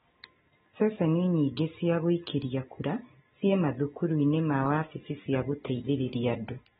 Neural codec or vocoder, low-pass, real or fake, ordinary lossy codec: none; 19.8 kHz; real; AAC, 16 kbps